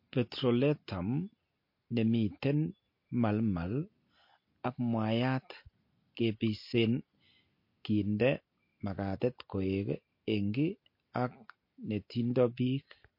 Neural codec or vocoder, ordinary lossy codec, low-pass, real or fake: none; MP3, 32 kbps; 5.4 kHz; real